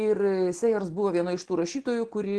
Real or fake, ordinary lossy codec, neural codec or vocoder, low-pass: real; Opus, 16 kbps; none; 10.8 kHz